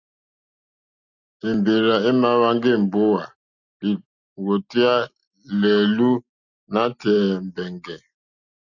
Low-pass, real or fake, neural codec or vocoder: 7.2 kHz; real; none